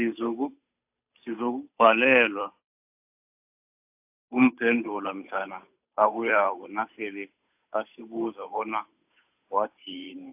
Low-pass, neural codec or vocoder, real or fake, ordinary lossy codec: 3.6 kHz; codec, 16 kHz, 8 kbps, FunCodec, trained on Chinese and English, 25 frames a second; fake; AAC, 32 kbps